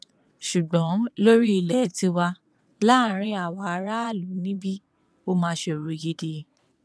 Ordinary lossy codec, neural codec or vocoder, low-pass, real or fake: none; vocoder, 22.05 kHz, 80 mel bands, WaveNeXt; none; fake